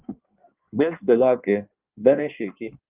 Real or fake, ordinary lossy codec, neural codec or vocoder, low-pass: fake; Opus, 24 kbps; codec, 16 kHz in and 24 kHz out, 1.1 kbps, FireRedTTS-2 codec; 3.6 kHz